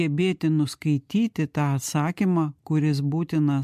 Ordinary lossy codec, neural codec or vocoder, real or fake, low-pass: MP3, 64 kbps; none; real; 14.4 kHz